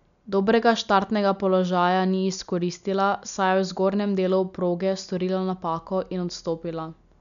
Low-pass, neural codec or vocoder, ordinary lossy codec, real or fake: 7.2 kHz; none; none; real